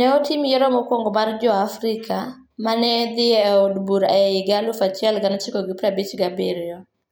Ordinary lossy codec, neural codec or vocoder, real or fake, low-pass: none; none; real; none